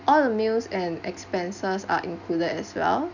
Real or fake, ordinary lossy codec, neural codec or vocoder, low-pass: real; none; none; 7.2 kHz